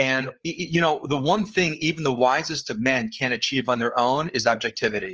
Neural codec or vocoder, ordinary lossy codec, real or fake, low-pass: codec, 16 kHz, 4 kbps, FreqCodec, larger model; Opus, 24 kbps; fake; 7.2 kHz